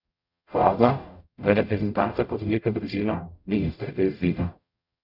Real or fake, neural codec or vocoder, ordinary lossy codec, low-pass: fake; codec, 44.1 kHz, 0.9 kbps, DAC; none; 5.4 kHz